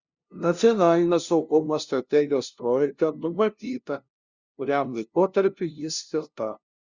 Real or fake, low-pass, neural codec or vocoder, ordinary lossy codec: fake; 7.2 kHz; codec, 16 kHz, 0.5 kbps, FunCodec, trained on LibriTTS, 25 frames a second; Opus, 64 kbps